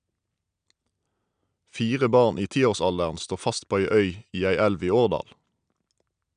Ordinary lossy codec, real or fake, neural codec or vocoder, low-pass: none; real; none; 9.9 kHz